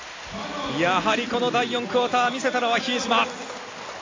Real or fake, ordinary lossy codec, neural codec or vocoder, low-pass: real; AAC, 32 kbps; none; 7.2 kHz